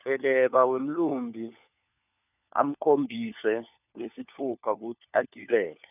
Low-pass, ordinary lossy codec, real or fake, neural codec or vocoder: 3.6 kHz; none; fake; codec, 16 kHz, 4 kbps, FunCodec, trained on LibriTTS, 50 frames a second